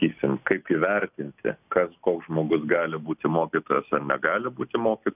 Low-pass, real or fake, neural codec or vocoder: 3.6 kHz; real; none